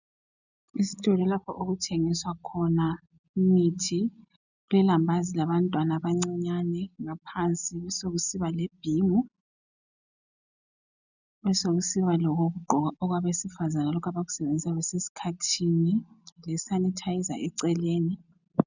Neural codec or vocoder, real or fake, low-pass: none; real; 7.2 kHz